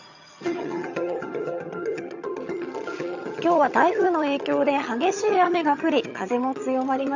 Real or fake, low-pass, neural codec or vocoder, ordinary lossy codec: fake; 7.2 kHz; vocoder, 22.05 kHz, 80 mel bands, HiFi-GAN; none